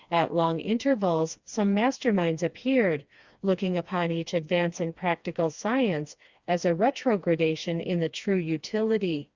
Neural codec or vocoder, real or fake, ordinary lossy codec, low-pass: codec, 16 kHz, 2 kbps, FreqCodec, smaller model; fake; Opus, 64 kbps; 7.2 kHz